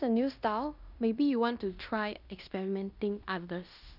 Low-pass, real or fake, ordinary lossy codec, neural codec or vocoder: 5.4 kHz; fake; none; codec, 16 kHz in and 24 kHz out, 0.9 kbps, LongCat-Audio-Codec, fine tuned four codebook decoder